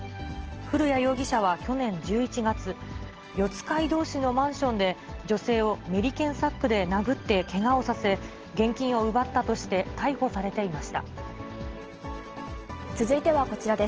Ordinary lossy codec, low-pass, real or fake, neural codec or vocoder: Opus, 16 kbps; 7.2 kHz; real; none